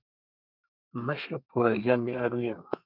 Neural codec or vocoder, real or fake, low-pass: codec, 32 kHz, 1.9 kbps, SNAC; fake; 5.4 kHz